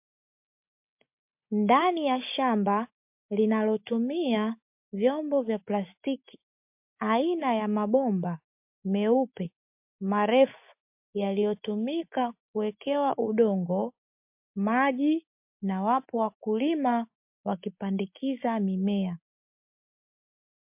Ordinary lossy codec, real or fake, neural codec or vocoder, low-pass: MP3, 32 kbps; real; none; 3.6 kHz